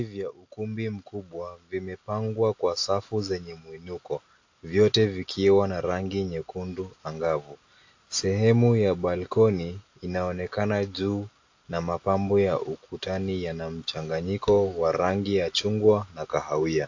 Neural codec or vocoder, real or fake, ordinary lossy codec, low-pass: none; real; AAC, 48 kbps; 7.2 kHz